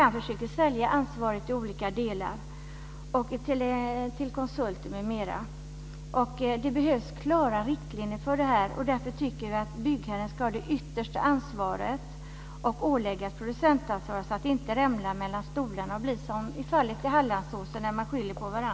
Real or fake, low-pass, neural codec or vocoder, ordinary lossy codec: real; none; none; none